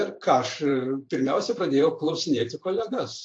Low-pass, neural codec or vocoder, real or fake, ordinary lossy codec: 9.9 kHz; vocoder, 44.1 kHz, 128 mel bands every 512 samples, BigVGAN v2; fake; AAC, 48 kbps